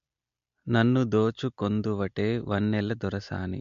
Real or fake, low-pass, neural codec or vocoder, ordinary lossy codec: real; 7.2 kHz; none; MP3, 48 kbps